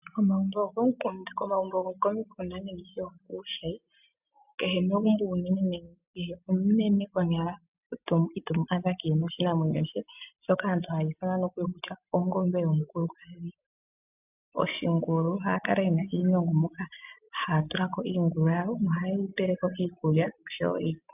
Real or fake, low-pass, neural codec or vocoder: real; 3.6 kHz; none